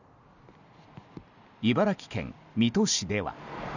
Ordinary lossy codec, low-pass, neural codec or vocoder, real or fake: none; 7.2 kHz; none; real